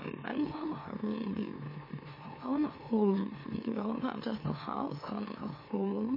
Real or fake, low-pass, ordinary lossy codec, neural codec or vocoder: fake; 5.4 kHz; MP3, 24 kbps; autoencoder, 44.1 kHz, a latent of 192 numbers a frame, MeloTTS